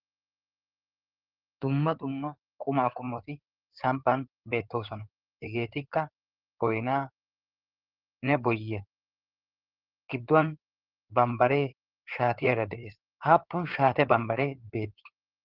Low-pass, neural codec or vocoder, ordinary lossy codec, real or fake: 5.4 kHz; codec, 16 kHz in and 24 kHz out, 2.2 kbps, FireRedTTS-2 codec; Opus, 16 kbps; fake